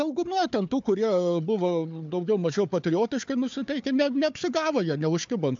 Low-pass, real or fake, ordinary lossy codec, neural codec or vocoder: 7.2 kHz; fake; MP3, 64 kbps; codec, 16 kHz, 4 kbps, FunCodec, trained on Chinese and English, 50 frames a second